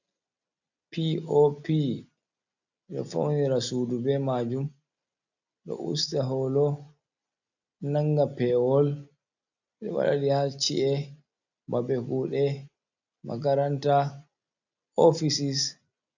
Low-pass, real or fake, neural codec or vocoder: 7.2 kHz; real; none